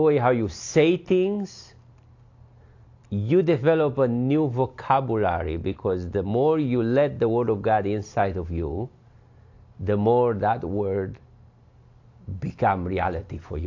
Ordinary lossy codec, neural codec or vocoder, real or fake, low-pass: AAC, 48 kbps; none; real; 7.2 kHz